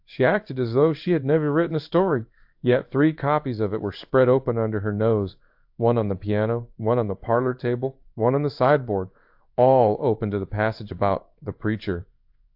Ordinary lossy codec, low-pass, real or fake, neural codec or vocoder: AAC, 48 kbps; 5.4 kHz; fake; codec, 16 kHz in and 24 kHz out, 1 kbps, XY-Tokenizer